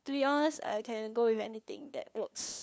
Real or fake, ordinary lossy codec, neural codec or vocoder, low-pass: fake; none; codec, 16 kHz, 2 kbps, FunCodec, trained on LibriTTS, 25 frames a second; none